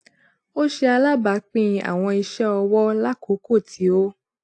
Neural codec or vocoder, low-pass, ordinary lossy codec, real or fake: none; 10.8 kHz; AAC, 64 kbps; real